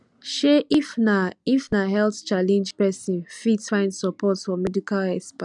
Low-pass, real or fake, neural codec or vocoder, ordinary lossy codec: 10.8 kHz; real; none; none